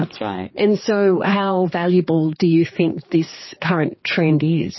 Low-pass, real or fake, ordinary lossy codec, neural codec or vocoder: 7.2 kHz; fake; MP3, 24 kbps; codec, 16 kHz, 4 kbps, X-Codec, HuBERT features, trained on general audio